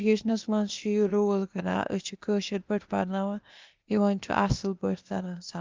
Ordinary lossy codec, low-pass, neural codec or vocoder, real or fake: Opus, 32 kbps; 7.2 kHz; codec, 16 kHz, 0.8 kbps, ZipCodec; fake